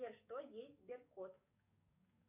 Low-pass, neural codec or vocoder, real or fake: 3.6 kHz; codec, 44.1 kHz, 7.8 kbps, DAC; fake